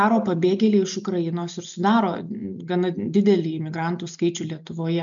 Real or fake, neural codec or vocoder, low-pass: real; none; 7.2 kHz